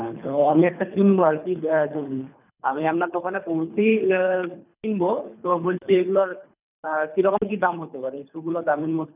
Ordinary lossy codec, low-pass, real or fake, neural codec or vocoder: none; 3.6 kHz; fake; codec, 24 kHz, 3 kbps, HILCodec